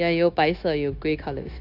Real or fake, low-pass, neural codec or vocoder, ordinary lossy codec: fake; 5.4 kHz; codec, 16 kHz, 0.9 kbps, LongCat-Audio-Codec; AAC, 48 kbps